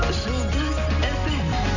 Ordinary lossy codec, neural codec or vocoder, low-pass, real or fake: none; none; 7.2 kHz; real